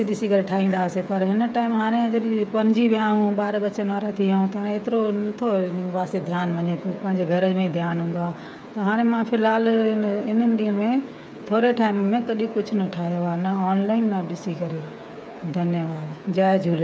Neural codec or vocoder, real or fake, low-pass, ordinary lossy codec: codec, 16 kHz, 8 kbps, FreqCodec, smaller model; fake; none; none